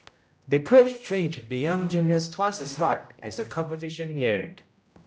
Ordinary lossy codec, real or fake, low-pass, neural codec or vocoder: none; fake; none; codec, 16 kHz, 0.5 kbps, X-Codec, HuBERT features, trained on general audio